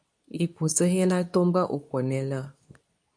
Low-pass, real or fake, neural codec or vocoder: 9.9 kHz; fake; codec, 24 kHz, 0.9 kbps, WavTokenizer, medium speech release version 2